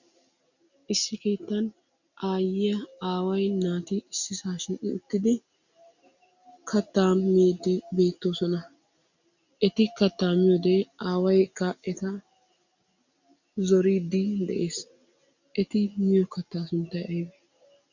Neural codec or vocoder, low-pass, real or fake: none; 7.2 kHz; real